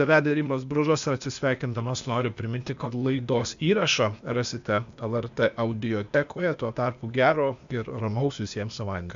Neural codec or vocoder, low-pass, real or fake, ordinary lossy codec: codec, 16 kHz, 0.8 kbps, ZipCodec; 7.2 kHz; fake; MP3, 64 kbps